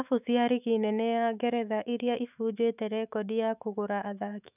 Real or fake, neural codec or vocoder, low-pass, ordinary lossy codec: fake; autoencoder, 48 kHz, 128 numbers a frame, DAC-VAE, trained on Japanese speech; 3.6 kHz; none